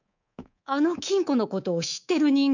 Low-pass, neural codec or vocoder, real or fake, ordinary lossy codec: 7.2 kHz; codec, 24 kHz, 3.1 kbps, DualCodec; fake; none